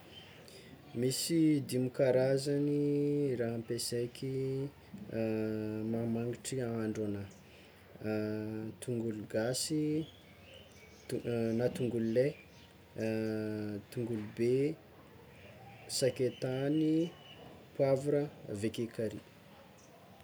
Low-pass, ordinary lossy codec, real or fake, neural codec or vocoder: none; none; real; none